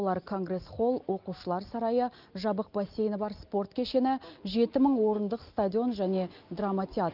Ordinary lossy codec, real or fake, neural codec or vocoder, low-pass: Opus, 24 kbps; real; none; 5.4 kHz